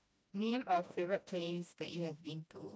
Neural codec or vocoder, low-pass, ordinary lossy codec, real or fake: codec, 16 kHz, 1 kbps, FreqCodec, smaller model; none; none; fake